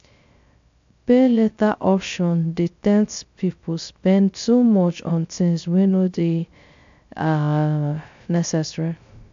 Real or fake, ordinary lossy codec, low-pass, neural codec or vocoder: fake; AAC, 64 kbps; 7.2 kHz; codec, 16 kHz, 0.3 kbps, FocalCodec